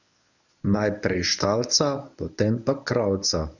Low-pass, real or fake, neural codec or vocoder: 7.2 kHz; fake; codec, 24 kHz, 0.9 kbps, WavTokenizer, medium speech release version 1